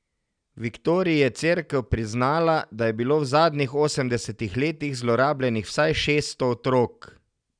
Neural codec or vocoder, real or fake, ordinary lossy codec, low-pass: none; real; none; 9.9 kHz